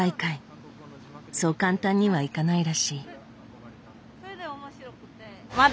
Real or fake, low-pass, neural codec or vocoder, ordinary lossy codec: real; none; none; none